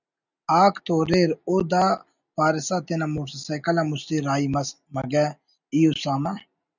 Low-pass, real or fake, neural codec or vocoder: 7.2 kHz; real; none